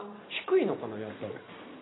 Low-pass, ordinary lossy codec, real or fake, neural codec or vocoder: 7.2 kHz; AAC, 16 kbps; real; none